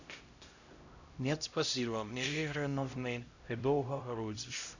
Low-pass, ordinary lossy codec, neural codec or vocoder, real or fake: 7.2 kHz; none; codec, 16 kHz, 0.5 kbps, X-Codec, HuBERT features, trained on LibriSpeech; fake